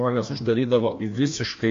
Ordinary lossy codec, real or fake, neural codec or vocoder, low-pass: AAC, 48 kbps; fake; codec, 16 kHz, 1 kbps, FreqCodec, larger model; 7.2 kHz